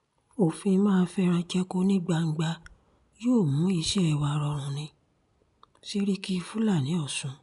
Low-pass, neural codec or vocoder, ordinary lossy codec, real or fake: 10.8 kHz; none; none; real